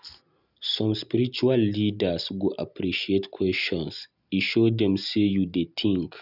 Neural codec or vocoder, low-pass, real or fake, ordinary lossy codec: none; 5.4 kHz; real; none